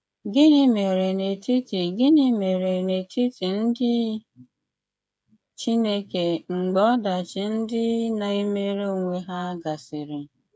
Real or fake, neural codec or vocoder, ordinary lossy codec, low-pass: fake; codec, 16 kHz, 8 kbps, FreqCodec, smaller model; none; none